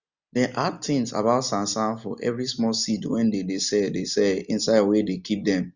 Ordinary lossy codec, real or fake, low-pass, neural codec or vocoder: Opus, 64 kbps; real; 7.2 kHz; none